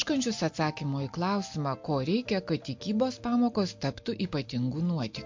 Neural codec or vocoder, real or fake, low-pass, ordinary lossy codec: none; real; 7.2 kHz; MP3, 48 kbps